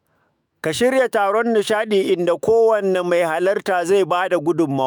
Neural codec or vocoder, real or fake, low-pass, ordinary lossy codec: autoencoder, 48 kHz, 128 numbers a frame, DAC-VAE, trained on Japanese speech; fake; none; none